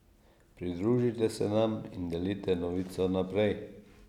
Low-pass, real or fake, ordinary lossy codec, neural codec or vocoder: 19.8 kHz; real; none; none